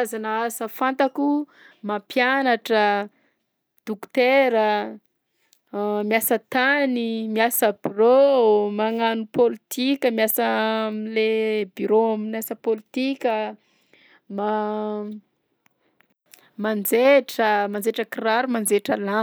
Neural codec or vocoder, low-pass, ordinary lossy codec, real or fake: none; none; none; real